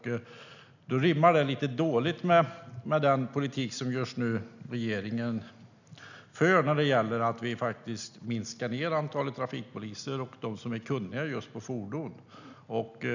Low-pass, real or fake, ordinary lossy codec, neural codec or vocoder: 7.2 kHz; real; none; none